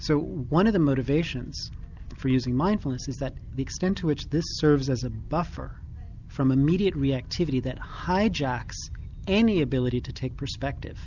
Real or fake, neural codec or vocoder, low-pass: real; none; 7.2 kHz